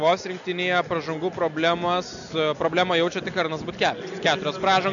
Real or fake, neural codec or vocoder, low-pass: real; none; 7.2 kHz